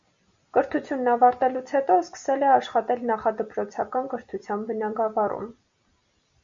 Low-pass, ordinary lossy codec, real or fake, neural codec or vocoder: 7.2 kHz; Opus, 64 kbps; real; none